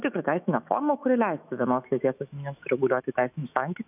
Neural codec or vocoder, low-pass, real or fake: autoencoder, 48 kHz, 128 numbers a frame, DAC-VAE, trained on Japanese speech; 3.6 kHz; fake